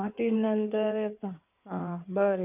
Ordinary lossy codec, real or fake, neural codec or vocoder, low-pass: none; fake; codec, 16 kHz in and 24 kHz out, 2.2 kbps, FireRedTTS-2 codec; 3.6 kHz